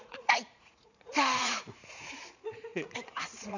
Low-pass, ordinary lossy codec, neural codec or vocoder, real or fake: 7.2 kHz; none; vocoder, 44.1 kHz, 80 mel bands, Vocos; fake